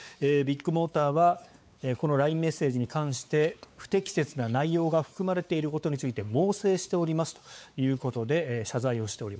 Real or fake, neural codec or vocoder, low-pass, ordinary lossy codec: fake; codec, 16 kHz, 4 kbps, X-Codec, WavLM features, trained on Multilingual LibriSpeech; none; none